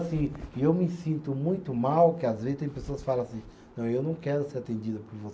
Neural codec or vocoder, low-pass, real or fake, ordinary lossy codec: none; none; real; none